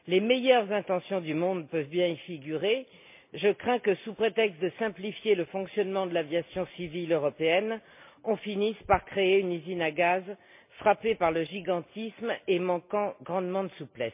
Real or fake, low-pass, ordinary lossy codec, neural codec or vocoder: real; 3.6 kHz; MP3, 32 kbps; none